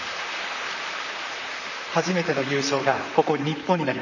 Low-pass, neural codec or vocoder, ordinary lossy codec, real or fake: 7.2 kHz; vocoder, 44.1 kHz, 128 mel bands, Pupu-Vocoder; none; fake